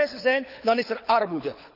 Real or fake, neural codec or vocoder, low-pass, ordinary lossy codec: fake; codec, 24 kHz, 6 kbps, HILCodec; 5.4 kHz; none